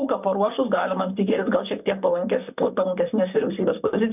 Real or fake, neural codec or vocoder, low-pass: fake; vocoder, 44.1 kHz, 128 mel bands, Pupu-Vocoder; 3.6 kHz